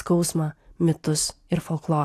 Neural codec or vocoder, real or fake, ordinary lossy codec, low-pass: none; real; AAC, 64 kbps; 14.4 kHz